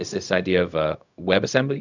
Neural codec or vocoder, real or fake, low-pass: codec, 16 kHz, 0.4 kbps, LongCat-Audio-Codec; fake; 7.2 kHz